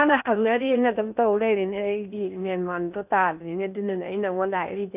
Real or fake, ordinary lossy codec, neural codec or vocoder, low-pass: fake; none; codec, 16 kHz in and 24 kHz out, 0.8 kbps, FocalCodec, streaming, 65536 codes; 3.6 kHz